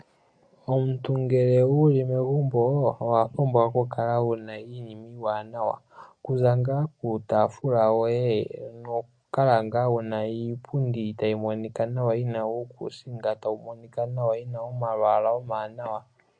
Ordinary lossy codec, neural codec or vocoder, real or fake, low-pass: MP3, 48 kbps; none; real; 9.9 kHz